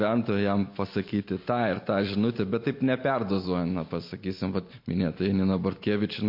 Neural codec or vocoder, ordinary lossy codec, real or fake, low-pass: none; MP3, 32 kbps; real; 5.4 kHz